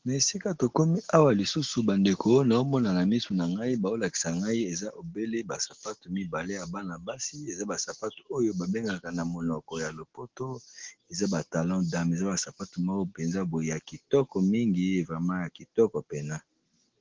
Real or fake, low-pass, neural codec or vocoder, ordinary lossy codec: real; 7.2 kHz; none; Opus, 16 kbps